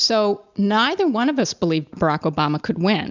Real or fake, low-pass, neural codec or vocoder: real; 7.2 kHz; none